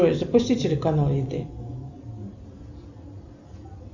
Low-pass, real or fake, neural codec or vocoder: 7.2 kHz; real; none